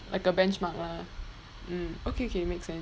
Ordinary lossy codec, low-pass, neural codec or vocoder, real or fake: none; none; none; real